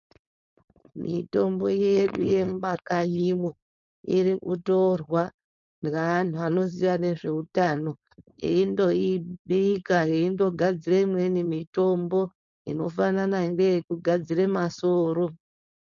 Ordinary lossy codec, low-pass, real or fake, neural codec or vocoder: MP3, 48 kbps; 7.2 kHz; fake; codec, 16 kHz, 4.8 kbps, FACodec